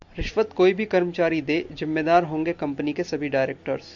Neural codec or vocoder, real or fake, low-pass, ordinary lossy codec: none; real; 7.2 kHz; AAC, 64 kbps